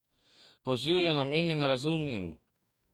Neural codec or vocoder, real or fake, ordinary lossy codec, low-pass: codec, 44.1 kHz, 2.6 kbps, DAC; fake; none; 19.8 kHz